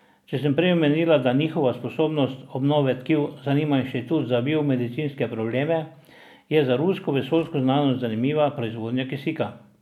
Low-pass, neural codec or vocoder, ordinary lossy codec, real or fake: 19.8 kHz; none; none; real